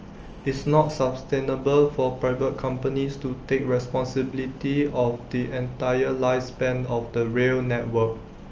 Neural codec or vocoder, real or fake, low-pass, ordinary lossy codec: none; real; 7.2 kHz; Opus, 24 kbps